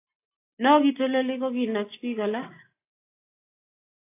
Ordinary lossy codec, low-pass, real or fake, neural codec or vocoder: AAC, 24 kbps; 3.6 kHz; real; none